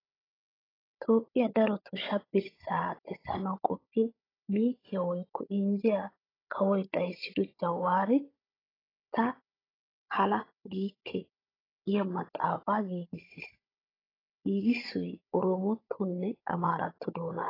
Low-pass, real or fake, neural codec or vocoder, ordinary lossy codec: 5.4 kHz; fake; codec, 16 kHz, 16 kbps, FunCodec, trained on Chinese and English, 50 frames a second; AAC, 24 kbps